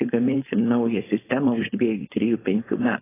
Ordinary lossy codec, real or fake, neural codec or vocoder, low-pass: AAC, 16 kbps; fake; codec, 16 kHz, 4.8 kbps, FACodec; 3.6 kHz